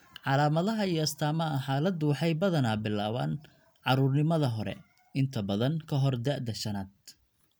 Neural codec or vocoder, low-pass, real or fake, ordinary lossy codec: none; none; real; none